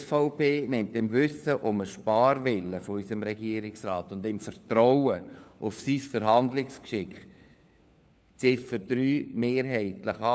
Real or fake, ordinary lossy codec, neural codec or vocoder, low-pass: fake; none; codec, 16 kHz, 4 kbps, FunCodec, trained on LibriTTS, 50 frames a second; none